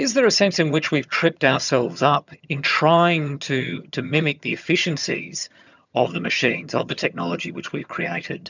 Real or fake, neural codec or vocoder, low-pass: fake; vocoder, 22.05 kHz, 80 mel bands, HiFi-GAN; 7.2 kHz